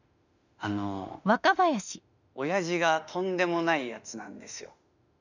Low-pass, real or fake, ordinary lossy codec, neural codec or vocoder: 7.2 kHz; fake; none; autoencoder, 48 kHz, 32 numbers a frame, DAC-VAE, trained on Japanese speech